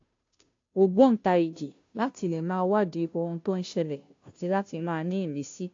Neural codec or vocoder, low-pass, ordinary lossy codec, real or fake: codec, 16 kHz, 0.5 kbps, FunCodec, trained on Chinese and English, 25 frames a second; 7.2 kHz; AAC, 48 kbps; fake